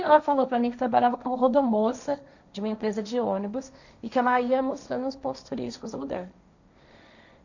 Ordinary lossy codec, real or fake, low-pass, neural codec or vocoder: none; fake; 7.2 kHz; codec, 16 kHz, 1.1 kbps, Voila-Tokenizer